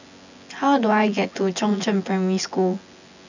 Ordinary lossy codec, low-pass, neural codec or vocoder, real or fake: none; 7.2 kHz; vocoder, 24 kHz, 100 mel bands, Vocos; fake